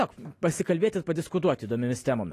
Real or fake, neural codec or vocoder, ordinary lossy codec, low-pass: real; none; AAC, 48 kbps; 14.4 kHz